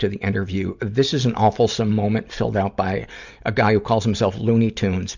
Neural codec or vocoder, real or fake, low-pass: none; real; 7.2 kHz